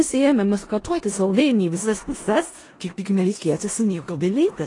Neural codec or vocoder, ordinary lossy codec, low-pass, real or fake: codec, 16 kHz in and 24 kHz out, 0.4 kbps, LongCat-Audio-Codec, four codebook decoder; AAC, 32 kbps; 10.8 kHz; fake